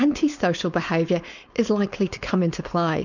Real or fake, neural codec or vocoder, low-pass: fake; codec, 16 kHz, 4.8 kbps, FACodec; 7.2 kHz